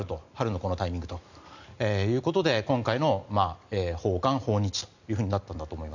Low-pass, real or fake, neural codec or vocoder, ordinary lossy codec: 7.2 kHz; real; none; none